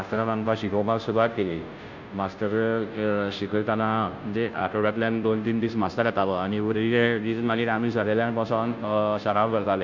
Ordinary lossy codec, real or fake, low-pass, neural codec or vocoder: Opus, 64 kbps; fake; 7.2 kHz; codec, 16 kHz, 0.5 kbps, FunCodec, trained on Chinese and English, 25 frames a second